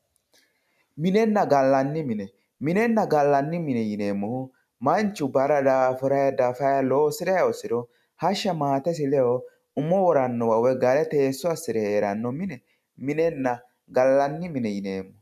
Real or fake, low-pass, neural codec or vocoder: real; 14.4 kHz; none